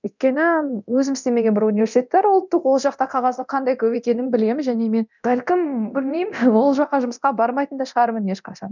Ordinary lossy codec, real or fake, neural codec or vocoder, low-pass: none; fake; codec, 24 kHz, 0.9 kbps, DualCodec; 7.2 kHz